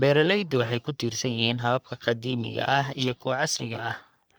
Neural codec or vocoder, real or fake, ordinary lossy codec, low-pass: codec, 44.1 kHz, 3.4 kbps, Pupu-Codec; fake; none; none